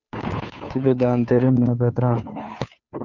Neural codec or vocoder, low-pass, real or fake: codec, 16 kHz, 2 kbps, FunCodec, trained on Chinese and English, 25 frames a second; 7.2 kHz; fake